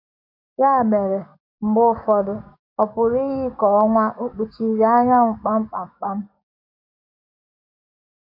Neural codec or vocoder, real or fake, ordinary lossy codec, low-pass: codec, 44.1 kHz, 7.8 kbps, DAC; fake; MP3, 48 kbps; 5.4 kHz